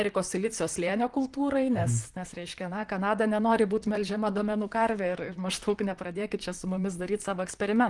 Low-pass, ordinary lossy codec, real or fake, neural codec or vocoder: 10.8 kHz; Opus, 24 kbps; fake; vocoder, 44.1 kHz, 128 mel bands, Pupu-Vocoder